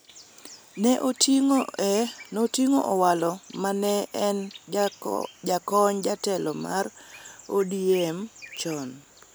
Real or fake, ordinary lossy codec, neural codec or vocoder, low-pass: real; none; none; none